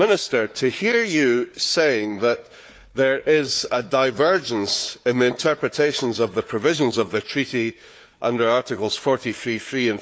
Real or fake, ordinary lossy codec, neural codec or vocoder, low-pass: fake; none; codec, 16 kHz, 4 kbps, FunCodec, trained on Chinese and English, 50 frames a second; none